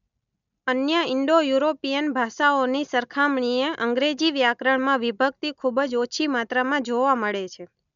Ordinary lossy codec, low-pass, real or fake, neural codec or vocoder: none; 7.2 kHz; real; none